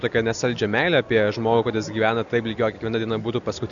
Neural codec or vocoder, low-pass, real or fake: none; 7.2 kHz; real